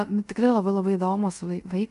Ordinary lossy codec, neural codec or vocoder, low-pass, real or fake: MP3, 64 kbps; codec, 24 kHz, 0.5 kbps, DualCodec; 10.8 kHz; fake